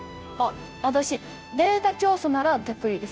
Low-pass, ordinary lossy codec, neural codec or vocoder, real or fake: none; none; codec, 16 kHz, 0.5 kbps, FunCodec, trained on Chinese and English, 25 frames a second; fake